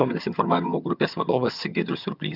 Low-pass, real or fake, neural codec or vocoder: 5.4 kHz; fake; vocoder, 22.05 kHz, 80 mel bands, HiFi-GAN